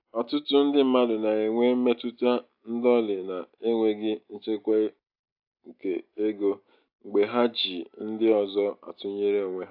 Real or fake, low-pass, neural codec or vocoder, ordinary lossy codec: real; 5.4 kHz; none; AAC, 48 kbps